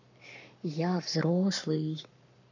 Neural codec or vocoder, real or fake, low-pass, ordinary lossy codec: codec, 44.1 kHz, 7.8 kbps, Pupu-Codec; fake; 7.2 kHz; none